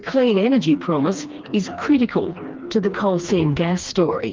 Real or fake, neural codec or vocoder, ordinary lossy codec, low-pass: fake; codec, 16 kHz, 2 kbps, FreqCodec, smaller model; Opus, 32 kbps; 7.2 kHz